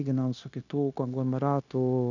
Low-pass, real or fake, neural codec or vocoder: 7.2 kHz; fake; codec, 16 kHz, 0.9 kbps, LongCat-Audio-Codec